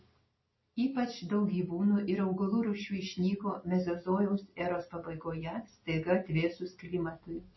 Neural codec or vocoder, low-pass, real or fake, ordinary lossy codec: none; 7.2 kHz; real; MP3, 24 kbps